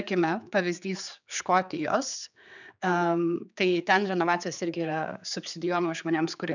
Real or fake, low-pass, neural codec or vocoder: fake; 7.2 kHz; codec, 16 kHz, 4 kbps, X-Codec, HuBERT features, trained on general audio